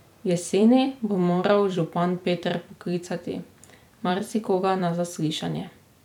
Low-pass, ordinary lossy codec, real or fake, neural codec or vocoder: 19.8 kHz; none; fake; vocoder, 44.1 kHz, 128 mel bands every 256 samples, BigVGAN v2